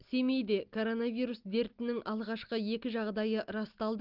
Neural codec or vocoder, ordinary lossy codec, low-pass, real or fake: none; Opus, 32 kbps; 5.4 kHz; real